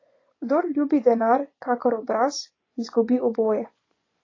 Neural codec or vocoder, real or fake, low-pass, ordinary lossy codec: vocoder, 24 kHz, 100 mel bands, Vocos; fake; 7.2 kHz; AAC, 32 kbps